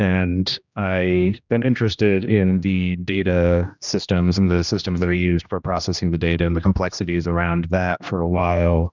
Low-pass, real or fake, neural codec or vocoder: 7.2 kHz; fake; codec, 16 kHz, 1 kbps, X-Codec, HuBERT features, trained on general audio